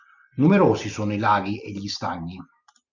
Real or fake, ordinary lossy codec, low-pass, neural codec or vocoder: real; Opus, 64 kbps; 7.2 kHz; none